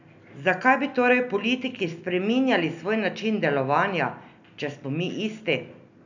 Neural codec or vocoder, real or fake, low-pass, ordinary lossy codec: none; real; 7.2 kHz; none